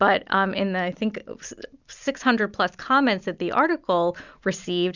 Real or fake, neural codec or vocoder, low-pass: real; none; 7.2 kHz